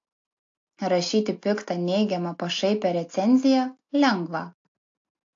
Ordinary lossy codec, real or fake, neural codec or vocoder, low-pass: AAC, 48 kbps; real; none; 7.2 kHz